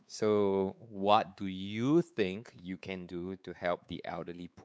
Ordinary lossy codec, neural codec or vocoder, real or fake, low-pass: none; codec, 16 kHz, 4 kbps, X-Codec, WavLM features, trained on Multilingual LibriSpeech; fake; none